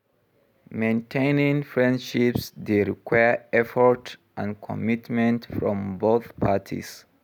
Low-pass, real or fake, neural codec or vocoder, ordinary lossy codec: 19.8 kHz; real; none; none